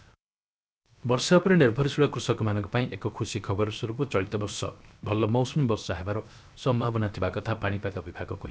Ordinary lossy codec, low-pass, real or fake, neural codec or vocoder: none; none; fake; codec, 16 kHz, 0.7 kbps, FocalCodec